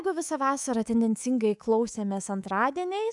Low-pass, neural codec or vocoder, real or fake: 10.8 kHz; codec, 24 kHz, 3.1 kbps, DualCodec; fake